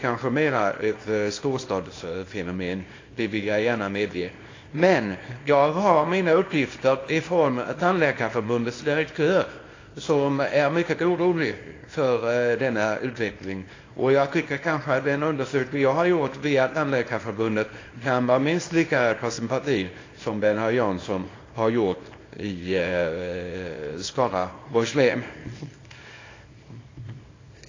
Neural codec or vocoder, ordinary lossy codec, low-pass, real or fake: codec, 24 kHz, 0.9 kbps, WavTokenizer, small release; AAC, 32 kbps; 7.2 kHz; fake